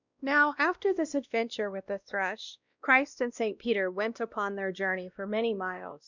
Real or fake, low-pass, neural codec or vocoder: fake; 7.2 kHz; codec, 16 kHz, 1 kbps, X-Codec, WavLM features, trained on Multilingual LibriSpeech